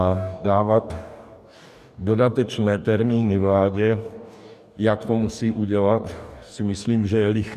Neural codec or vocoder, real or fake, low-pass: codec, 44.1 kHz, 2.6 kbps, DAC; fake; 14.4 kHz